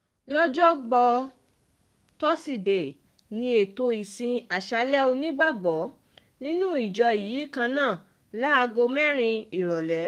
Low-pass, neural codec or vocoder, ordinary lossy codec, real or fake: 14.4 kHz; codec, 32 kHz, 1.9 kbps, SNAC; Opus, 32 kbps; fake